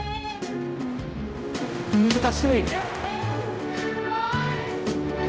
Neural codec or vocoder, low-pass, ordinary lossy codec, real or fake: codec, 16 kHz, 0.5 kbps, X-Codec, HuBERT features, trained on general audio; none; none; fake